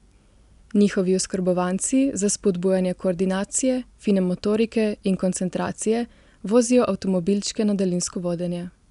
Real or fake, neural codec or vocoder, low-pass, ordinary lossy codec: real; none; 10.8 kHz; none